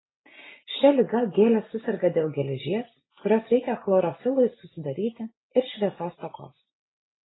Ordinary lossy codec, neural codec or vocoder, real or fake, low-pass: AAC, 16 kbps; none; real; 7.2 kHz